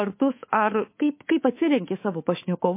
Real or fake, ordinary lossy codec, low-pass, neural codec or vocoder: fake; MP3, 24 kbps; 3.6 kHz; codec, 24 kHz, 3.1 kbps, DualCodec